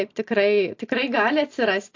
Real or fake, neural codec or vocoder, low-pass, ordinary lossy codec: fake; vocoder, 44.1 kHz, 128 mel bands every 256 samples, BigVGAN v2; 7.2 kHz; AAC, 48 kbps